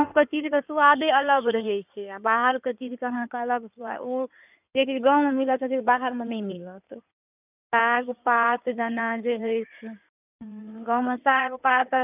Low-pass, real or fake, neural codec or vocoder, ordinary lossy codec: 3.6 kHz; fake; codec, 16 kHz in and 24 kHz out, 2.2 kbps, FireRedTTS-2 codec; none